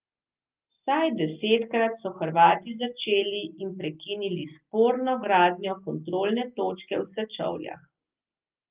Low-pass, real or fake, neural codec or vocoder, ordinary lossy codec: 3.6 kHz; real; none; Opus, 32 kbps